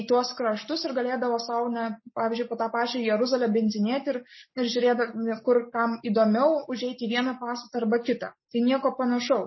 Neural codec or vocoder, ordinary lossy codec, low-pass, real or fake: none; MP3, 24 kbps; 7.2 kHz; real